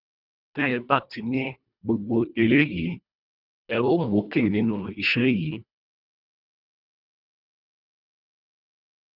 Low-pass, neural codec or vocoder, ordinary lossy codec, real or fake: 5.4 kHz; codec, 24 kHz, 1.5 kbps, HILCodec; none; fake